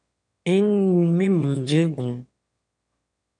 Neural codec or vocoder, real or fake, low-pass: autoencoder, 22.05 kHz, a latent of 192 numbers a frame, VITS, trained on one speaker; fake; 9.9 kHz